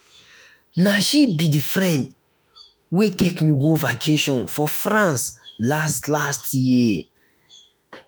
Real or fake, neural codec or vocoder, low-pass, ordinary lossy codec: fake; autoencoder, 48 kHz, 32 numbers a frame, DAC-VAE, trained on Japanese speech; none; none